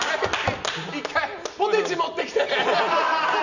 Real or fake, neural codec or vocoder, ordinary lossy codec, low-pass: real; none; none; 7.2 kHz